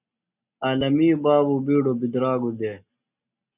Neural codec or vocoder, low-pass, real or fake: none; 3.6 kHz; real